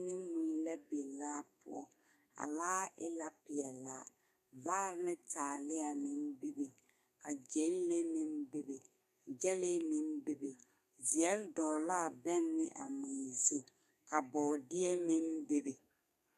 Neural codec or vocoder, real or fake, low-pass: codec, 32 kHz, 1.9 kbps, SNAC; fake; 14.4 kHz